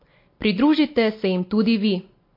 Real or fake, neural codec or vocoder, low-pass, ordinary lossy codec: real; none; 5.4 kHz; MP3, 32 kbps